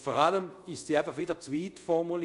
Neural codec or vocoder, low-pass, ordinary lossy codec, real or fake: codec, 24 kHz, 0.5 kbps, DualCodec; 10.8 kHz; none; fake